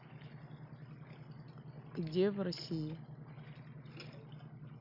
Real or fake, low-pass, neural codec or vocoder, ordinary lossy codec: real; 5.4 kHz; none; none